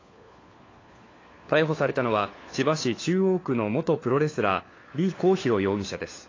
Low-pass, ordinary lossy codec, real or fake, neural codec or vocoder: 7.2 kHz; AAC, 32 kbps; fake; codec, 16 kHz, 4 kbps, FunCodec, trained on LibriTTS, 50 frames a second